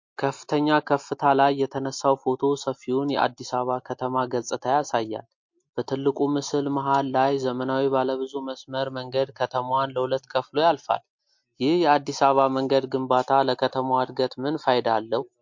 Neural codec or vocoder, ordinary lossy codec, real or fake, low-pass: none; MP3, 48 kbps; real; 7.2 kHz